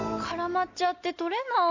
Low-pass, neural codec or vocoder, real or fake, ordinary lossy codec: 7.2 kHz; none; real; AAC, 48 kbps